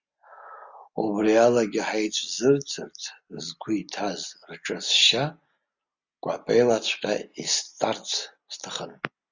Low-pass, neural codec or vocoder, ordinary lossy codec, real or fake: 7.2 kHz; none; Opus, 64 kbps; real